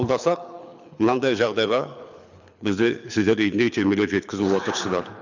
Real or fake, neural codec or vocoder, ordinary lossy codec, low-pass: fake; codec, 24 kHz, 6 kbps, HILCodec; none; 7.2 kHz